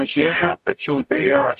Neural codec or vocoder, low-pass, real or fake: codec, 44.1 kHz, 0.9 kbps, DAC; 14.4 kHz; fake